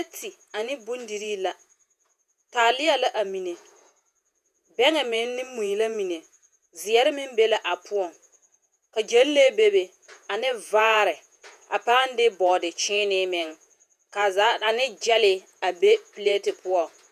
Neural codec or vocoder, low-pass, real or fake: vocoder, 48 kHz, 128 mel bands, Vocos; 14.4 kHz; fake